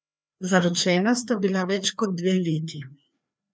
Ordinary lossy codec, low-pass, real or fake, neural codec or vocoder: none; none; fake; codec, 16 kHz, 2 kbps, FreqCodec, larger model